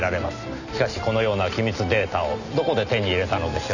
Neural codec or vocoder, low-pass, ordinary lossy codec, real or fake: none; 7.2 kHz; none; real